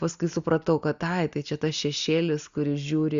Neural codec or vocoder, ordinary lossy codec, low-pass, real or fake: none; Opus, 64 kbps; 7.2 kHz; real